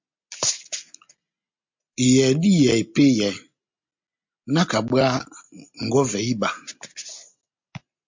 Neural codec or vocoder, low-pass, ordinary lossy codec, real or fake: none; 7.2 kHz; MP3, 48 kbps; real